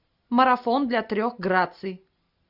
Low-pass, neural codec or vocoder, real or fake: 5.4 kHz; none; real